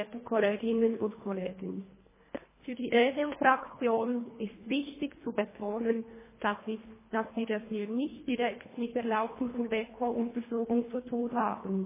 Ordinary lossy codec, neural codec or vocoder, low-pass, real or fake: MP3, 16 kbps; codec, 24 kHz, 1.5 kbps, HILCodec; 3.6 kHz; fake